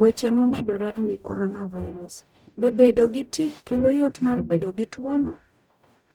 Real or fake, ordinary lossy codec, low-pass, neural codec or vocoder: fake; none; 19.8 kHz; codec, 44.1 kHz, 0.9 kbps, DAC